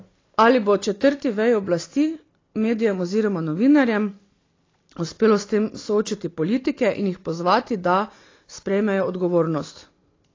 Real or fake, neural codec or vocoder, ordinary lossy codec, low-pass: real; none; AAC, 32 kbps; 7.2 kHz